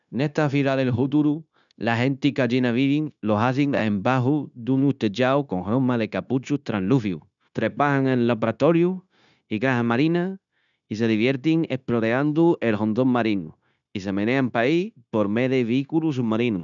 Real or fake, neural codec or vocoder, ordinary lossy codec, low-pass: fake; codec, 16 kHz, 0.9 kbps, LongCat-Audio-Codec; none; 7.2 kHz